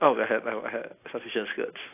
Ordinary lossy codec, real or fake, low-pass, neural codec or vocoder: AAC, 24 kbps; real; 3.6 kHz; none